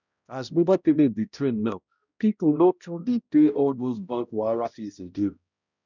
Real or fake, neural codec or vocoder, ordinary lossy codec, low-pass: fake; codec, 16 kHz, 0.5 kbps, X-Codec, HuBERT features, trained on balanced general audio; none; 7.2 kHz